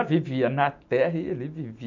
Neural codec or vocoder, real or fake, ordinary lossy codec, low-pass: none; real; none; 7.2 kHz